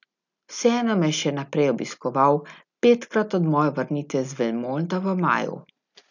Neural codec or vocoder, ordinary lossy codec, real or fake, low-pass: none; none; real; 7.2 kHz